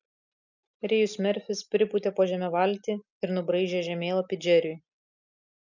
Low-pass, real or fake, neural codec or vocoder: 7.2 kHz; real; none